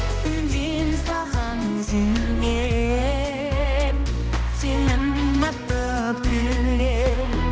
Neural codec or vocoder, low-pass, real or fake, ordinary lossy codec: codec, 16 kHz, 1 kbps, X-Codec, HuBERT features, trained on balanced general audio; none; fake; none